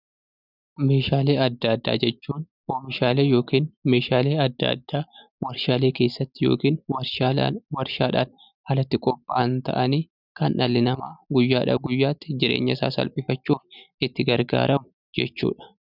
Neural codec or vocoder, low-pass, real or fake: none; 5.4 kHz; real